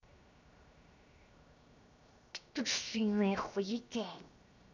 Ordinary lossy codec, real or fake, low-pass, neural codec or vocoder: none; fake; 7.2 kHz; codec, 16 kHz, 0.7 kbps, FocalCodec